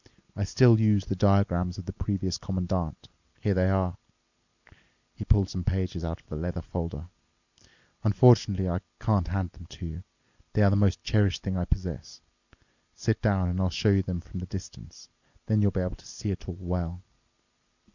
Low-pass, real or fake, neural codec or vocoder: 7.2 kHz; real; none